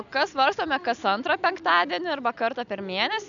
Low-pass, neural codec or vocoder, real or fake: 7.2 kHz; none; real